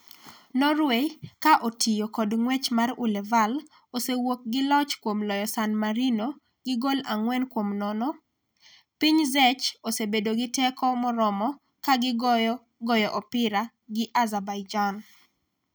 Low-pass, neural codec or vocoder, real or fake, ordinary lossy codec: none; none; real; none